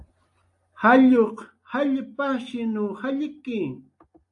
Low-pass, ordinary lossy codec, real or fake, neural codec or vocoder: 10.8 kHz; AAC, 64 kbps; real; none